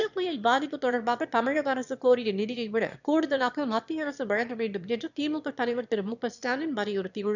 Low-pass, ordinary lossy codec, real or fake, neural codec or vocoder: 7.2 kHz; none; fake; autoencoder, 22.05 kHz, a latent of 192 numbers a frame, VITS, trained on one speaker